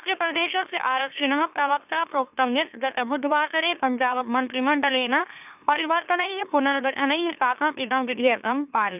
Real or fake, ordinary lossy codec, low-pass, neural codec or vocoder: fake; none; 3.6 kHz; autoencoder, 44.1 kHz, a latent of 192 numbers a frame, MeloTTS